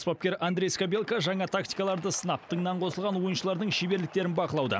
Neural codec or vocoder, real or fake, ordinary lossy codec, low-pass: none; real; none; none